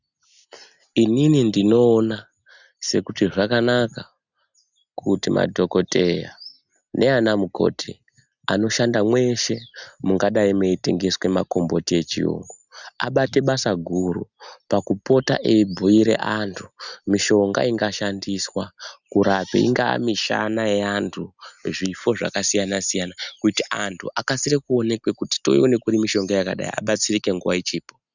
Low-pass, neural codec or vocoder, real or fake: 7.2 kHz; none; real